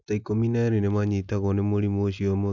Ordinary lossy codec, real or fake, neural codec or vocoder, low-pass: none; real; none; 7.2 kHz